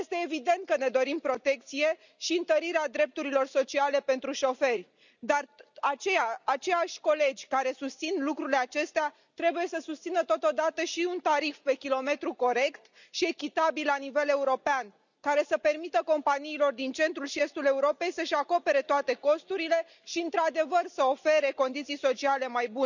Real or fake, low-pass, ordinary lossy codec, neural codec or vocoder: real; 7.2 kHz; none; none